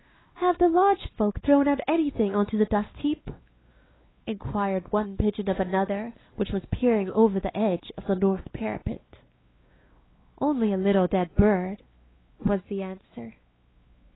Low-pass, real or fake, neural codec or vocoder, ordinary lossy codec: 7.2 kHz; fake; codec, 16 kHz, 2 kbps, X-Codec, WavLM features, trained on Multilingual LibriSpeech; AAC, 16 kbps